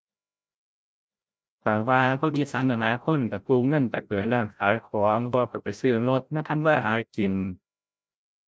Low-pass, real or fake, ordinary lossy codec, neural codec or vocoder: none; fake; none; codec, 16 kHz, 0.5 kbps, FreqCodec, larger model